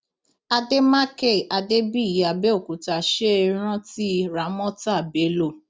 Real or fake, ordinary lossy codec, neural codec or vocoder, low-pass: real; none; none; none